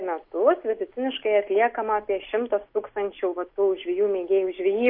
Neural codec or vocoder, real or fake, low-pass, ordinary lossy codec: none; real; 5.4 kHz; MP3, 32 kbps